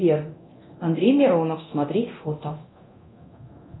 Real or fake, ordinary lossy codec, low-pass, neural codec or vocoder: fake; AAC, 16 kbps; 7.2 kHz; codec, 24 kHz, 0.9 kbps, DualCodec